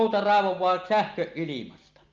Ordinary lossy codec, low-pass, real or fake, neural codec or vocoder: Opus, 24 kbps; 7.2 kHz; real; none